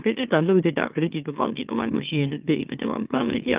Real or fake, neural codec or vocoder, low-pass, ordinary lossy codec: fake; autoencoder, 44.1 kHz, a latent of 192 numbers a frame, MeloTTS; 3.6 kHz; Opus, 32 kbps